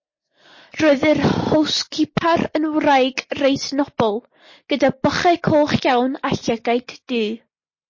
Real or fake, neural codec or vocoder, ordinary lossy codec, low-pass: real; none; MP3, 32 kbps; 7.2 kHz